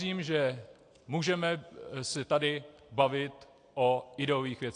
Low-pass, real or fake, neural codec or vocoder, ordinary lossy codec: 9.9 kHz; real; none; AAC, 48 kbps